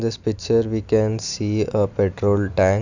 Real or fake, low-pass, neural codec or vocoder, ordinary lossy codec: real; 7.2 kHz; none; none